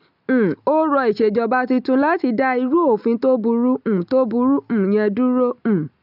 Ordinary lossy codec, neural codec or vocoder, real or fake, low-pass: none; none; real; 5.4 kHz